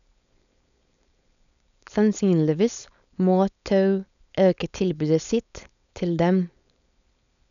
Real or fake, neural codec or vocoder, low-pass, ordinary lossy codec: fake; codec, 16 kHz, 4.8 kbps, FACodec; 7.2 kHz; none